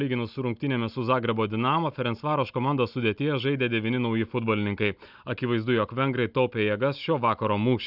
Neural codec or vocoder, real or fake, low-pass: none; real; 5.4 kHz